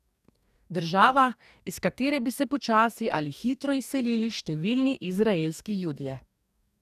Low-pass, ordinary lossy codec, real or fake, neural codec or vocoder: 14.4 kHz; none; fake; codec, 44.1 kHz, 2.6 kbps, DAC